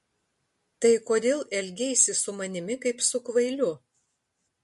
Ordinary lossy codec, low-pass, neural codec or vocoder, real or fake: MP3, 48 kbps; 14.4 kHz; none; real